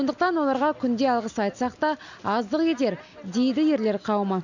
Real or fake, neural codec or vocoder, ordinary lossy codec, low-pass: real; none; none; 7.2 kHz